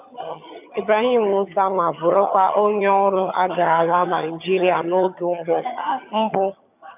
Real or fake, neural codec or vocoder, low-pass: fake; vocoder, 22.05 kHz, 80 mel bands, HiFi-GAN; 3.6 kHz